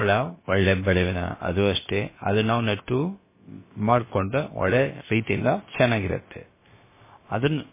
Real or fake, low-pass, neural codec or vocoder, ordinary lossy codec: fake; 3.6 kHz; codec, 16 kHz, about 1 kbps, DyCAST, with the encoder's durations; MP3, 16 kbps